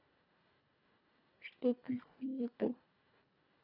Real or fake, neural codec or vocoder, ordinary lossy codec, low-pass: fake; codec, 24 kHz, 1.5 kbps, HILCodec; none; 5.4 kHz